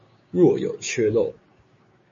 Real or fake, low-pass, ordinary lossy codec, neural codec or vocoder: fake; 7.2 kHz; MP3, 32 kbps; codec, 16 kHz, 6 kbps, DAC